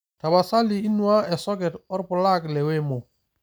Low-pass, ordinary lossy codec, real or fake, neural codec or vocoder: none; none; real; none